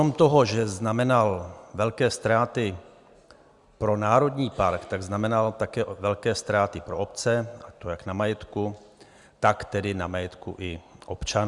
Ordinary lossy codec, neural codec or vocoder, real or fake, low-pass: Opus, 64 kbps; none; real; 10.8 kHz